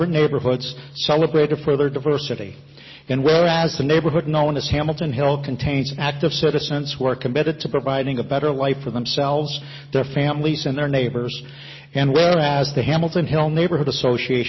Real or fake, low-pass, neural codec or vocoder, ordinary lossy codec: real; 7.2 kHz; none; MP3, 24 kbps